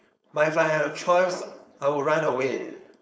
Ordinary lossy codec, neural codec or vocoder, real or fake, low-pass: none; codec, 16 kHz, 4.8 kbps, FACodec; fake; none